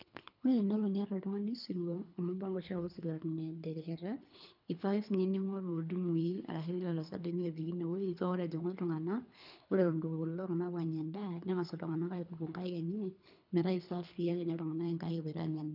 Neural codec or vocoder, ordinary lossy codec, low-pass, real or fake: codec, 24 kHz, 3 kbps, HILCodec; none; 5.4 kHz; fake